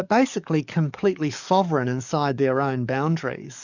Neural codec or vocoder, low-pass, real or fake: codec, 44.1 kHz, 7.8 kbps, DAC; 7.2 kHz; fake